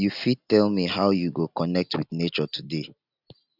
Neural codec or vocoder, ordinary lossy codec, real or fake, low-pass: none; none; real; 5.4 kHz